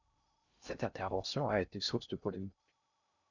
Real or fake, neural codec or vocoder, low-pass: fake; codec, 16 kHz in and 24 kHz out, 0.6 kbps, FocalCodec, streaming, 2048 codes; 7.2 kHz